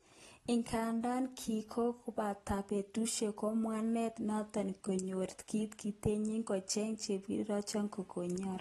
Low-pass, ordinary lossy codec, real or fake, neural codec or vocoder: 19.8 kHz; AAC, 32 kbps; real; none